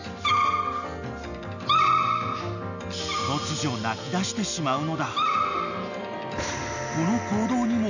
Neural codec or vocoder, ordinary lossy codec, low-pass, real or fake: none; none; 7.2 kHz; real